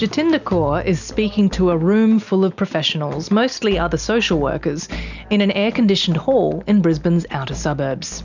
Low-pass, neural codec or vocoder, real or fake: 7.2 kHz; none; real